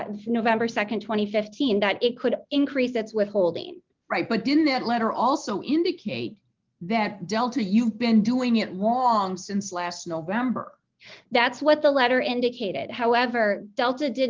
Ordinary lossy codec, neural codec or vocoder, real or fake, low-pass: Opus, 24 kbps; none; real; 7.2 kHz